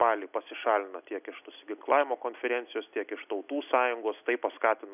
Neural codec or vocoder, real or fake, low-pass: none; real; 3.6 kHz